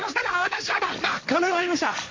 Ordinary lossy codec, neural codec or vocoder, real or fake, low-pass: MP3, 48 kbps; codec, 16 kHz, 1.1 kbps, Voila-Tokenizer; fake; 7.2 kHz